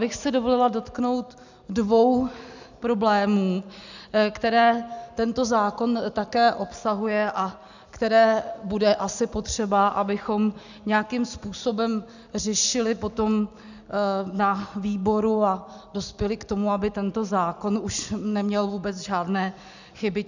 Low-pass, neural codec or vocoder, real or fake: 7.2 kHz; none; real